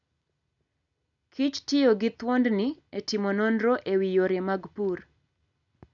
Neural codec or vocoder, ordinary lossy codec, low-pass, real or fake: none; none; 7.2 kHz; real